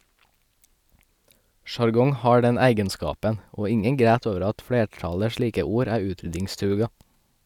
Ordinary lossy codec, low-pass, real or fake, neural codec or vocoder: none; 19.8 kHz; real; none